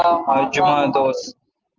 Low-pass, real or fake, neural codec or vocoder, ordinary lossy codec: 7.2 kHz; real; none; Opus, 24 kbps